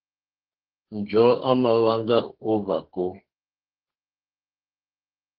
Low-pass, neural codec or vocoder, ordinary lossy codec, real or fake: 5.4 kHz; codec, 32 kHz, 1.9 kbps, SNAC; Opus, 16 kbps; fake